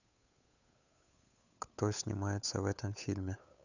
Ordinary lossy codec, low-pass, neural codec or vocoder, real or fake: none; 7.2 kHz; codec, 16 kHz, 8 kbps, FunCodec, trained on Chinese and English, 25 frames a second; fake